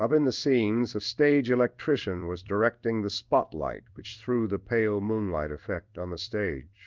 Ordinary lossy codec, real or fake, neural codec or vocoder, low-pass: Opus, 32 kbps; fake; codec, 16 kHz in and 24 kHz out, 1 kbps, XY-Tokenizer; 7.2 kHz